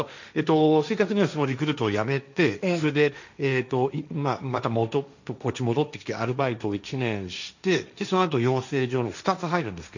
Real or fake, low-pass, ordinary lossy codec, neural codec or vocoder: fake; 7.2 kHz; none; codec, 16 kHz, 1.1 kbps, Voila-Tokenizer